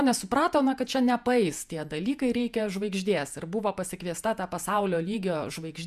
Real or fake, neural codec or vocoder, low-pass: fake; vocoder, 48 kHz, 128 mel bands, Vocos; 14.4 kHz